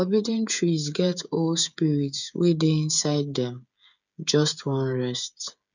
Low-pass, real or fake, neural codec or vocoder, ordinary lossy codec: 7.2 kHz; fake; codec, 16 kHz, 8 kbps, FreqCodec, smaller model; none